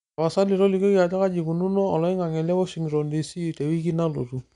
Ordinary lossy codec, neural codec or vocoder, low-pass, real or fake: none; none; 10.8 kHz; real